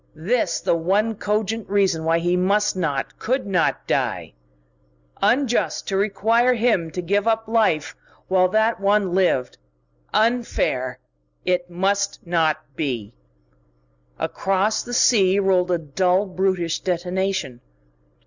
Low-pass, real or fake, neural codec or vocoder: 7.2 kHz; real; none